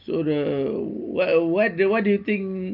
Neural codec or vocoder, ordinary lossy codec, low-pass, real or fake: none; Opus, 32 kbps; 5.4 kHz; real